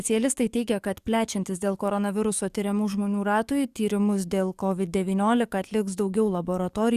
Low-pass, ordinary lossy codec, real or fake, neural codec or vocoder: 14.4 kHz; Opus, 64 kbps; fake; codec, 44.1 kHz, 7.8 kbps, DAC